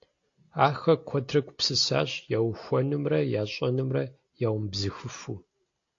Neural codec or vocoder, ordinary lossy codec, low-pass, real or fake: none; AAC, 48 kbps; 7.2 kHz; real